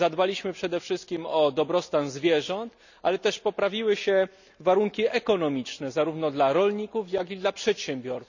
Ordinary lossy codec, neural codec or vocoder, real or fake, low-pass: none; none; real; 7.2 kHz